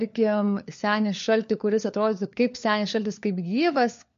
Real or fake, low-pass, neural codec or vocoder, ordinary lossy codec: fake; 7.2 kHz; codec, 16 kHz, 4 kbps, FunCodec, trained on LibriTTS, 50 frames a second; MP3, 48 kbps